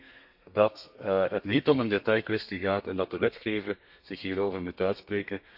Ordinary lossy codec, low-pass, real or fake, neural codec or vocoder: AAC, 48 kbps; 5.4 kHz; fake; codec, 32 kHz, 1.9 kbps, SNAC